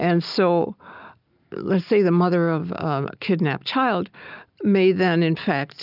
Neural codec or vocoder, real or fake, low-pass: none; real; 5.4 kHz